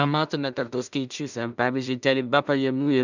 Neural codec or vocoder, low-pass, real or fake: codec, 16 kHz in and 24 kHz out, 0.4 kbps, LongCat-Audio-Codec, two codebook decoder; 7.2 kHz; fake